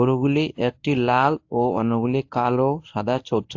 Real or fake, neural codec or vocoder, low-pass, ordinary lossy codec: fake; codec, 24 kHz, 0.9 kbps, WavTokenizer, medium speech release version 1; 7.2 kHz; AAC, 48 kbps